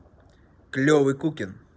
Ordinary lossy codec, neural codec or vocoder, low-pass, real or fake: none; none; none; real